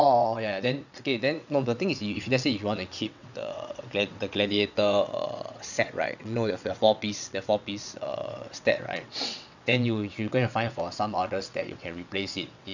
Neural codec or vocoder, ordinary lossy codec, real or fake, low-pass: vocoder, 22.05 kHz, 80 mel bands, WaveNeXt; none; fake; 7.2 kHz